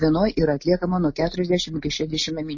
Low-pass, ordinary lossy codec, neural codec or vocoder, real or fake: 7.2 kHz; MP3, 32 kbps; none; real